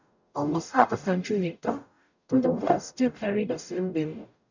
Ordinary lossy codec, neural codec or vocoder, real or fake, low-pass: none; codec, 44.1 kHz, 0.9 kbps, DAC; fake; 7.2 kHz